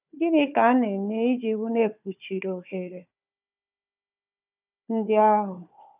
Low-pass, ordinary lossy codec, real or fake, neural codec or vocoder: 3.6 kHz; none; fake; codec, 16 kHz, 4 kbps, FunCodec, trained on Chinese and English, 50 frames a second